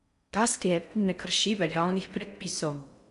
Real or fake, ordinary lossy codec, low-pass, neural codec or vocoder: fake; MP3, 96 kbps; 10.8 kHz; codec, 16 kHz in and 24 kHz out, 0.6 kbps, FocalCodec, streaming, 4096 codes